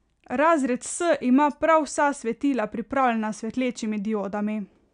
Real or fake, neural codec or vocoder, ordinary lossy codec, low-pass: real; none; none; 9.9 kHz